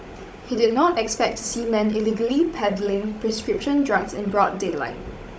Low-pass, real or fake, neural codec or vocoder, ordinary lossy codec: none; fake; codec, 16 kHz, 16 kbps, FunCodec, trained on Chinese and English, 50 frames a second; none